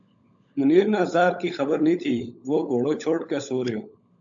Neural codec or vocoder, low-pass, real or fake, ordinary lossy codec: codec, 16 kHz, 16 kbps, FunCodec, trained on LibriTTS, 50 frames a second; 7.2 kHz; fake; AAC, 64 kbps